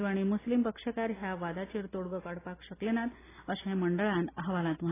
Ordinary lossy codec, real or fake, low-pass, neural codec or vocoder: AAC, 16 kbps; real; 3.6 kHz; none